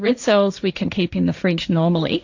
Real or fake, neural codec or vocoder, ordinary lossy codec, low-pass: fake; codec, 16 kHz, 1.1 kbps, Voila-Tokenizer; AAC, 48 kbps; 7.2 kHz